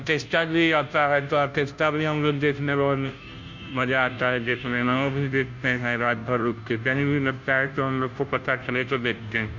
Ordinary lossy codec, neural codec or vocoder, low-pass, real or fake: MP3, 48 kbps; codec, 16 kHz, 0.5 kbps, FunCodec, trained on Chinese and English, 25 frames a second; 7.2 kHz; fake